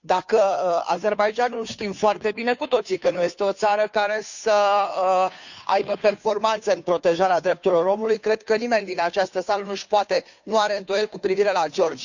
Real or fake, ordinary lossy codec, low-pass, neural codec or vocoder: fake; none; 7.2 kHz; codec, 16 kHz, 2 kbps, FunCodec, trained on Chinese and English, 25 frames a second